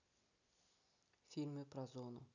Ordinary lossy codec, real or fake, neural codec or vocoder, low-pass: none; real; none; 7.2 kHz